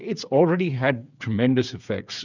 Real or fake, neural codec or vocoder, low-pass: fake; codec, 16 kHz, 8 kbps, FreqCodec, smaller model; 7.2 kHz